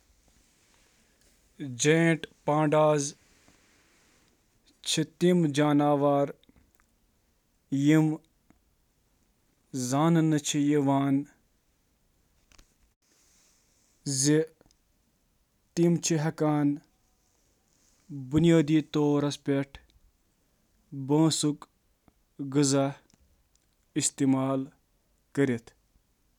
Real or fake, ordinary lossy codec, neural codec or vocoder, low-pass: real; none; none; 19.8 kHz